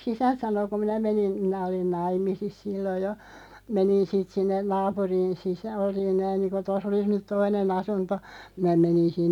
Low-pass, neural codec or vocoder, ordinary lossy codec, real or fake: 19.8 kHz; none; none; real